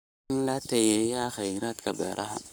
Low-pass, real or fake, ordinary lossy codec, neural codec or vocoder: none; fake; none; codec, 44.1 kHz, 7.8 kbps, Pupu-Codec